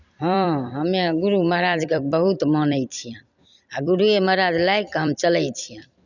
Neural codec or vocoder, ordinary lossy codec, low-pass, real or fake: vocoder, 44.1 kHz, 128 mel bands every 256 samples, BigVGAN v2; none; 7.2 kHz; fake